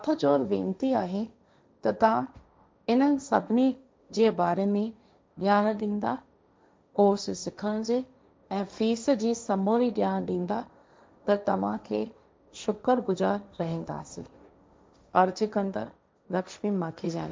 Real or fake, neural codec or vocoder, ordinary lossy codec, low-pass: fake; codec, 16 kHz, 1.1 kbps, Voila-Tokenizer; none; none